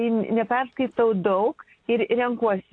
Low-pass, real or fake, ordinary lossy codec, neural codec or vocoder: 9.9 kHz; real; AAC, 48 kbps; none